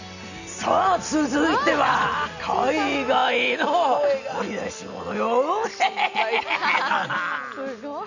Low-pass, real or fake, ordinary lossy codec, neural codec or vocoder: 7.2 kHz; real; none; none